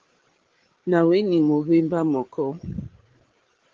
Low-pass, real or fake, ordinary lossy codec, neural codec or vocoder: 7.2 kHz; fake; Opus, 16 kbps; codec, 16 kHz, 8 kbps, FreqCodec, larger model